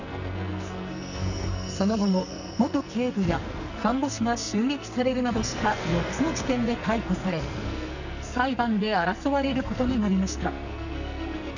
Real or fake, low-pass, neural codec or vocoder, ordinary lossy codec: fake; 7.2 kHz; codec, 44.1 kHz, 2.6 kbps, SNAC; none